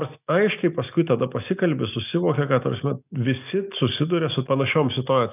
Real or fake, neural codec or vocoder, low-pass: real; none; 3.6 kHz